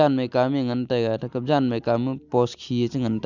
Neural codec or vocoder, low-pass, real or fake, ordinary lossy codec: none; 7.2 kHz; real; none